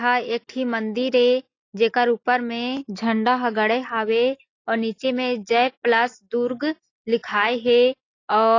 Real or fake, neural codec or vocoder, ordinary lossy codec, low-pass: real; none; AAC, 32 kbps; 7.2 kHz